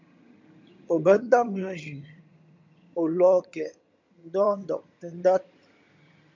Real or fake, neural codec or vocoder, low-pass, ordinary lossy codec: fake; vocoder, 22.05 kHz, 80 mel bands, HiFi-GAN; 7.2 kHz; MP3, 64 kbps